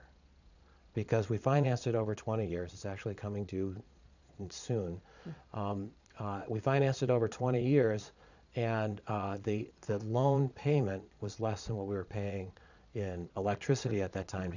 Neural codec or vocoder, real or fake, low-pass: vocoder, 22.05 kHz, 80 mel bands, Vocos; fake; 7.2 kHz